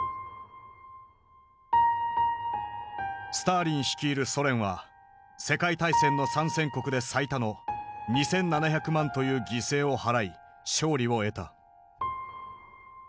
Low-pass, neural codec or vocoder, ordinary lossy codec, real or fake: none; none; none; real